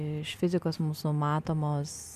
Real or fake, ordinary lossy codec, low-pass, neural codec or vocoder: real; MP3, 96 kbps; 14.4 kHz; none